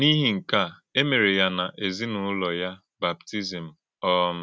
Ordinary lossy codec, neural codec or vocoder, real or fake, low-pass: none; none; real; none